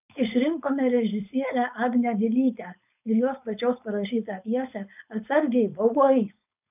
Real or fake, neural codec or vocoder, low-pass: fake; codec, 16 kHz, 4.8 kbps, FACodec; 3.6 kHz